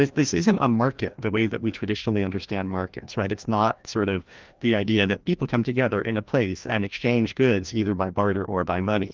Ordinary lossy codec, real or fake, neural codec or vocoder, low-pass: Opus, 24 kbps; fake; codec, 16 kHz, 1 kbps, FreqCodec, larger model; 7.2 kHz